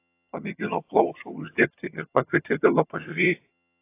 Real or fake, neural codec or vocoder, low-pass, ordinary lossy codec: fake; vocoder, 22.05 kHz, 80 mel bands, HiFi-GAN; 3.6 kHz; AAC, 24 kbps